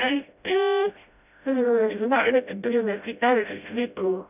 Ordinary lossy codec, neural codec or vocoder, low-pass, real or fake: none; codec, 16 kHz, 0.5 kbps, FreqCodec, smaller model; 3.6 kHz; fake